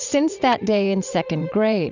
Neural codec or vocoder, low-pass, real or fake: vocoder, 44.1 kHz, 80 mel bands, Vocos; 7.2 kHz; fake